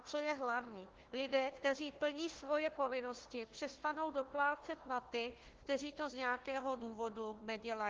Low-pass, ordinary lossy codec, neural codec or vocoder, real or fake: 7.2 kHz; Opus, 16 kbps; codec, 16 kHz, 1 kbps, FunCodec, trained on Chinese and English, 50 frames a second; fake